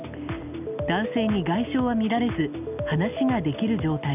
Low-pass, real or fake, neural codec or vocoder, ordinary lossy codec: 3.6 kHz; real; none; none